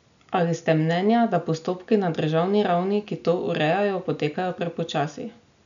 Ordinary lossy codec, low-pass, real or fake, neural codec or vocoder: none; 7.2 kHz; real; none